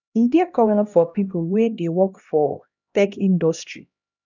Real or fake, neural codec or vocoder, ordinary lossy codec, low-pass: fake; codec, 16 kHz, 1 kbps, X-Codec, HuBERT features, trained on LibriSpeech; none; 7.2 kHz